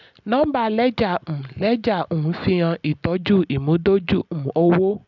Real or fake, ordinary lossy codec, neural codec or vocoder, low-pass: real; none; none; 7.2 kHz